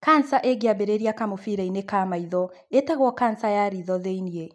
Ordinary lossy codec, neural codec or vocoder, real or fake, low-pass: none; none; real; none